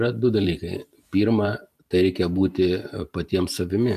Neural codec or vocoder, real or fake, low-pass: vocoder, 44.1 kHz, 128 mel bands every 512 samples, BigVGAN v2; fake; 14.4 kHz